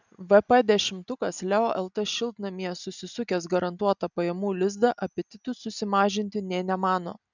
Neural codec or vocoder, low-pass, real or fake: none; 7.2 kHz; real